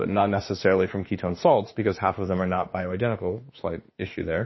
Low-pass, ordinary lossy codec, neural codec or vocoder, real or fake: 7.2 kHz; MP3, 24 kbps; autoencoder, 48 kHz, 32 numbers a frame, DAC-VAE, trained on Japanese speech; fake